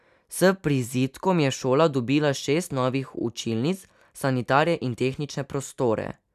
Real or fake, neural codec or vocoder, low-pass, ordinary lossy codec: real; none; 14.4 kHz; none